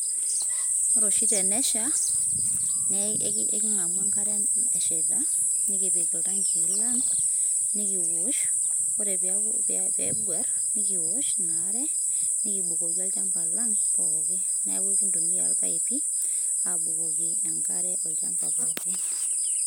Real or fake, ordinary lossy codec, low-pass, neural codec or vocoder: real; none; none; none